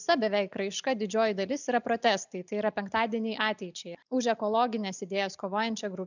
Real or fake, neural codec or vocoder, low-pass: real; none; 7.2 kHz